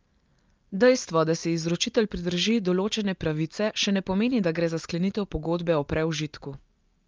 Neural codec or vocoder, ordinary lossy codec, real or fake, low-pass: none; Opus, 24 kbps; real; 7.2 kHz